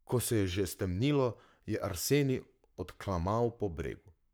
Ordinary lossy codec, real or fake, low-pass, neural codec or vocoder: none; fake; none; codec, 44.1 kHz, 7.8 kbps, Pupu-Codec